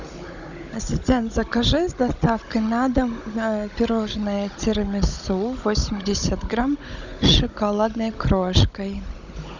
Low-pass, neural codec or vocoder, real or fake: 7.2 kHz; codec, 16 kHz, 16 kbps, FunCodec, trained on Chinese and English, 50 frames a second; fake